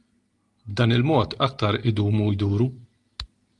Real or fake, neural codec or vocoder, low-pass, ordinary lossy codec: real; none; 10.8 kHz; Opus, 32 kbps